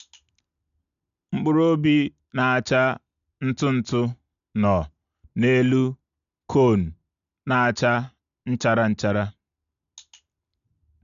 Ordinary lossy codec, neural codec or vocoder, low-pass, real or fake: MP3, 96 kbps; none; 7.2 kHz; real